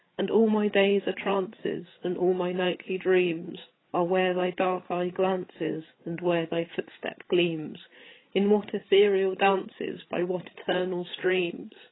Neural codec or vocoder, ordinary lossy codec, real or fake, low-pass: codec, 16 kHz, 8 kbps, FreqCodec, larger model; AAC, 16 kbps; fake; 7.2 kHz